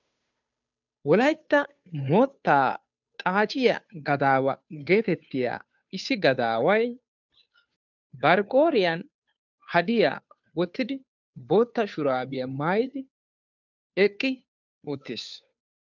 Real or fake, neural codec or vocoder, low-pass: fake; codec, 16 kHz, 2 kbps, FunCodec, trained on Chinese and English, 25 frames a second; 7.2 kHz